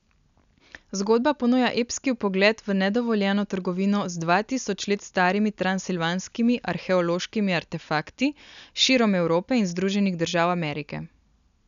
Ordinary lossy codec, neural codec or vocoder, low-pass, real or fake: none; none; 7.2 kHz; real